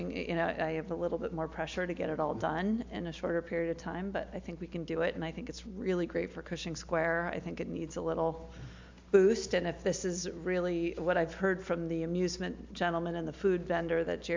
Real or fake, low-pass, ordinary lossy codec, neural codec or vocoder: real; 7.2 kHz; MP3, 64 kbps; none